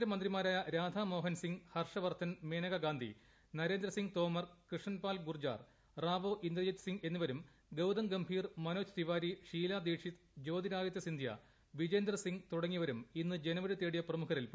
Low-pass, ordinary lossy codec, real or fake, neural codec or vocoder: none; none; real; none